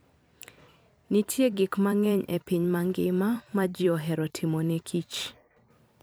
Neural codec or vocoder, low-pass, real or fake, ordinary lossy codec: vocoder, 44.1 kHz, 128 mel bands every 256 samples, BigVGAN v2; none; fake; none